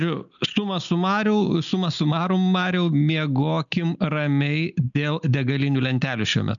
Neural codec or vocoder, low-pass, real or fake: none; 7.2 kHz; real